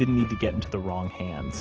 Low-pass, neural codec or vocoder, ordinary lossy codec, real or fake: 7.2 kHz; none; Opus, 16 kbps; real